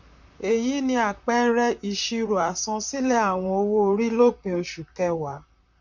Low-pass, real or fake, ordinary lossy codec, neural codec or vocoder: 7.2 kHz; fake; none; vocoder, 44.1 kHz, 128 mel bands, Pupu-Vocoder